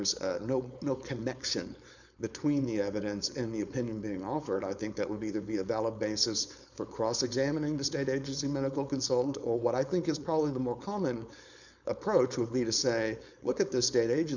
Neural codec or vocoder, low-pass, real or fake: codec, 16 kHz, 4.8 kbps, FACodec; 7.2 kHz; fake